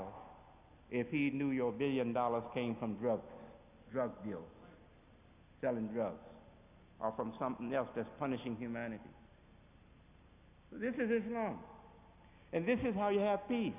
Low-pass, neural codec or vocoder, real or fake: 3.6 kHz; none; real